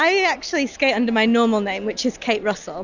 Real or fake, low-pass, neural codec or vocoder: real; 7.2 kHz; none